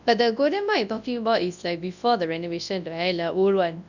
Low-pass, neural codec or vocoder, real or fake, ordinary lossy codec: 7.2 kHz; codec, 24 kHz, 0.9 kbps, WavTokenizer, large speech release; fake; none